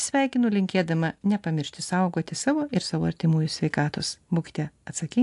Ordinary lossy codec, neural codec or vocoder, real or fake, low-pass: AAC, 64 kbps; none; real; 10.8 kHz